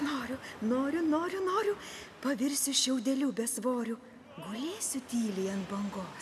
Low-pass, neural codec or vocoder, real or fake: 14.4 kHz; none; real